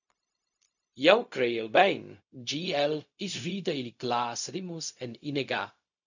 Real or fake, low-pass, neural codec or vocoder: fake; 7.2 kHz; codec, 16 kHz, 0.4 kbps, LongCat-Audio-Codec